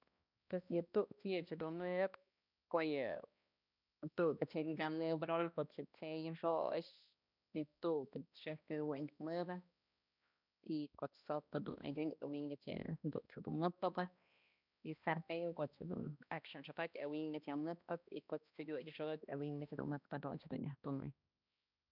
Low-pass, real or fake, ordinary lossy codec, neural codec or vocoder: 5.4 kHz; fake; none; codec, 16 kHz, 1 kbps, X-Codec, HuBERT features, trained on balanced general audio